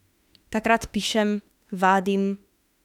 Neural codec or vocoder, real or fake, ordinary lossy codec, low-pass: autoencoder, 48 kHz, 32 numbers a frame, DAC-VAE, trained on Japanese speech; fake; none; 19.8 kHz